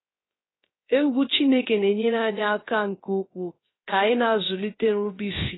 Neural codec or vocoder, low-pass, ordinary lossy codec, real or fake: codec, 16 kHz, 0.3 kbps, FocalCodec; 7.2 kHz; AAC, 16 kbps; fake